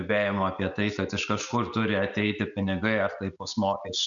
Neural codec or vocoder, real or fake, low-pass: none; real; 7.2 kHz